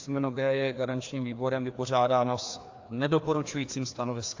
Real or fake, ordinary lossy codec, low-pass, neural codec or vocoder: fake; AAC, 48 kbps; 7.2 kHz; codec, 16 kHz, 2 kbps, FreqCodec, larger model